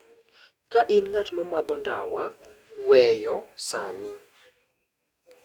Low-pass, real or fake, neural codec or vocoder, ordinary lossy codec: 19.8 kHz; fake; codec, 44.1 kHz, 2.6 kbps, DAC; none